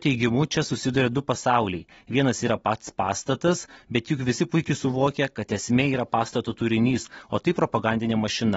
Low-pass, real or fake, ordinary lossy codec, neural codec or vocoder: 19.8 kHz; real; AAC, 24 kbps; none